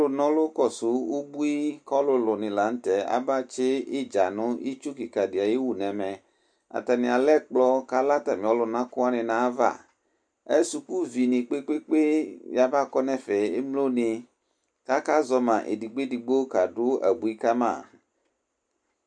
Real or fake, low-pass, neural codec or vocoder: real; 9.9 kHz; none